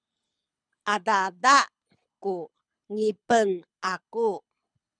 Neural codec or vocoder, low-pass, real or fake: codec, 24 kHz, 6 kbps, HILCodec; 9.9 kHz; fake